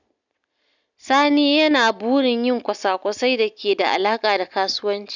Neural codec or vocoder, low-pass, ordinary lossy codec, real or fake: none; 7.2 kHz; none; real